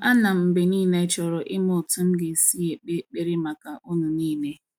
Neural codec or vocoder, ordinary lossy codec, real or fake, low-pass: none; none; real; 19.8 kHz